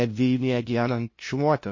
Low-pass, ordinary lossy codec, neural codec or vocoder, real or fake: 7.2 kHz; MP3, 32 kbps; codec, 16 kHz, 0.5 kbps, FunCodec, trained on LibriTTS, 25 frames a second; fake